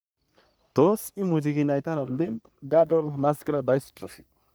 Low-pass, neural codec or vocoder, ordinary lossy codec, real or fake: none; codec, 44.1 kHz, 3.4 kbps, Pupu-Codec; none; fake